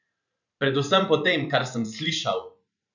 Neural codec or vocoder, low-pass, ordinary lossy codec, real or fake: vocoder, 44.1 kHz, 128 mel bands every 512 samples, BigVGAN v2; 7.2 kHz; none; fake